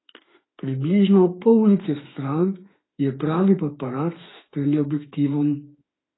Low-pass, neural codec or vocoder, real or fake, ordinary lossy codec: 7.2 kHz; autoencoder, 48 kHz, 32 numbers a frame, DAC-VAE, trained on Japanese speech; fake; AAC, 16 kbps